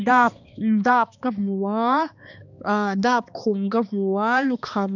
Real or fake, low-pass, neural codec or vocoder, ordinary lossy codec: fake; 7.2 kHz; codec, 16 kHz, 2 kbps, X-Codec, HuBERT features, trained on balanced general audio; none